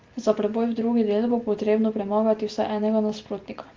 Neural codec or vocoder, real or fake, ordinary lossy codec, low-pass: none; real; Opus, 32 kbps; 7.2 kHz